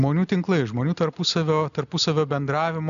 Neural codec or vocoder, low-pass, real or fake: none; 7.2 kHz; real